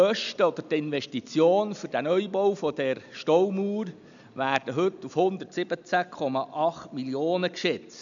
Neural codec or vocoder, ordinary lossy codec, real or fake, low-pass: none; none; real; 7.2 kHz